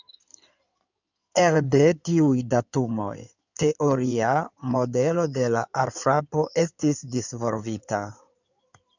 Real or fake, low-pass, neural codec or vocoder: fake; 7.2 kHz; codec, 16 kHz in and 24 kHz out, 2.2 kbps, FireRedTTS-2 codec